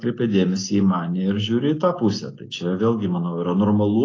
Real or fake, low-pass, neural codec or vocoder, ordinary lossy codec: real; 7.2 kHz; none; AAC, 32 kbps